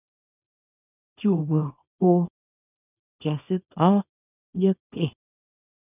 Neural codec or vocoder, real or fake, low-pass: codec, 24 kHz, 0.9 kbps, WavTokenizer, small release; fake; 3.6 kHz